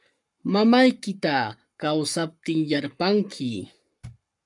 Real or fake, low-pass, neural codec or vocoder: fake; 10.8 kHz; codec, 44.1 kHz, 7.8 kbps, Pupu-Codec